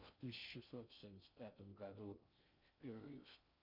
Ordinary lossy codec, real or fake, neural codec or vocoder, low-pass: MP3, 48 kbps; fake; codec, 16 kHz in and 24 kHz out, 0.6 kbps, FocalCodec, streaming, 2048 codes; 5.4 kHz